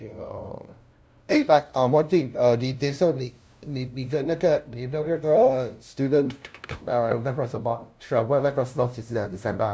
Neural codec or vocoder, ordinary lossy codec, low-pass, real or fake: codec, 16 kHz, 0.5 kbps, FunCodec, trained on LibriTTS, 25 frames a second; none; none; fake